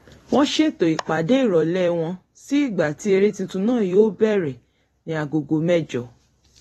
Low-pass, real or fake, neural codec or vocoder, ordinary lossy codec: 19.8 kHz; fake; vocoder, 44.1 kHz, 128 mel bands every 256 samples, BigVGAN v2; AAC, 32 kbps